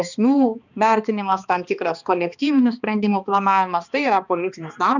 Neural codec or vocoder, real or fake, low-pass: codec, 16 kHz, 2 kbps, X-Codec, HuBERT features, trained on balanced general audio; fake; 7.2 kHz